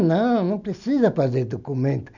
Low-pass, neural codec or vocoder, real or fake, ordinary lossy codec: 7.2 kHz; none; real; none